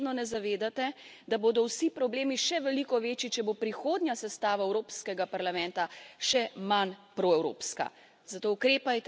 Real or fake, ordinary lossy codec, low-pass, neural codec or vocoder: real; none; none; none